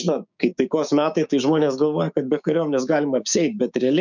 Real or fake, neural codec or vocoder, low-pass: fake; codec, 16 kHz, 6 kbps, DAC; 7.2 kHz